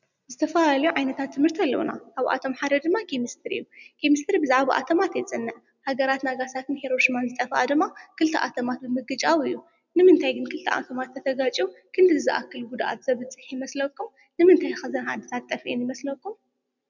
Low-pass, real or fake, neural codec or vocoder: 7.2 kHz; real; none